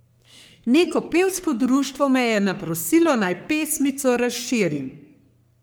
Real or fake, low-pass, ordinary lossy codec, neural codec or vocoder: fake; none; none; codec, 44.1 kHz, 3.4 kbps, Pupu-Codec